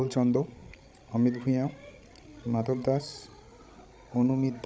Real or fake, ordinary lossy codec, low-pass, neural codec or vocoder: fake; none; none; codec, 16 kHz, 16 kbps, FreqCodec, larger model